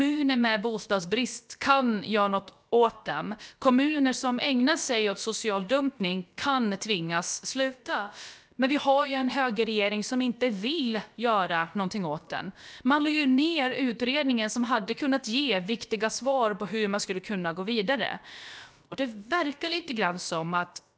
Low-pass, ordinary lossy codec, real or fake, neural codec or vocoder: none; none; fake; codec, 16 kHz, about 1 kbps, DyCAST, with the encoder's durations